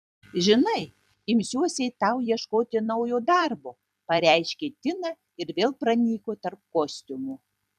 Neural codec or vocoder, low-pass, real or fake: vocoder, 44.1 kHz, 128 mel bands every 256 samples, BigVGAN v2; 14.4 kHz; fake